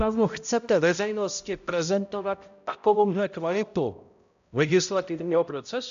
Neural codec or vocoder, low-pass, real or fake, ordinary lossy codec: codec, 16 kHz, 0.5 kbps, X-Codec, HuBERT features, trained on balanced general audio; 7.2 kHz; fake; AAC, 96 kbps